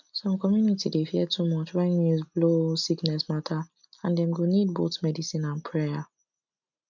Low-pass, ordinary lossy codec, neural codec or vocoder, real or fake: 7.2 kHz; none; none; real